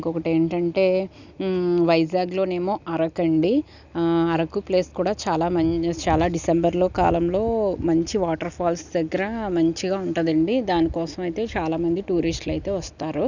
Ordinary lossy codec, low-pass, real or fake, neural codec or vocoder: none; 7.2 kHz; real; none